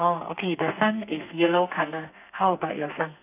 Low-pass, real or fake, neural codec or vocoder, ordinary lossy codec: 3.6 kHz; fake; codec, 32 kHz, 1.9 kbps, SNAC; none